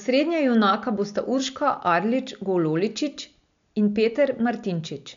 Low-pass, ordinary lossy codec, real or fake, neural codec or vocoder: 7.2 kHz; MP3, 64 kbps; real; none